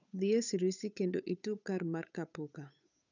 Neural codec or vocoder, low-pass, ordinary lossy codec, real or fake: codec, 16 kHz, 8 kbps, FunCodec, trained on Chinese and English, 25 frames a second; 7.2 kHz; none; fake